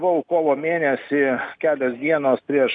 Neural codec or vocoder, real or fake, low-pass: none; real; 9.9 kHz